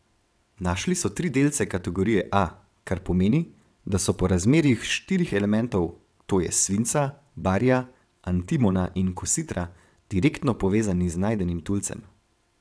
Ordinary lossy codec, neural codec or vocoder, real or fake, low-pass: none; vocoder, 22.05 kHz, 80 mel bands, WaveNeXt; fake; none